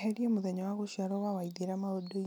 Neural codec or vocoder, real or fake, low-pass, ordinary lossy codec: none; real; none; none